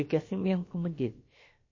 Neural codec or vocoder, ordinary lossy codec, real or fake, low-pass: codec, 16 kHz, about 1 kbps, DyCAST, with the encoder's durations; MP3, 32 kbps; fake; 7.2 kHz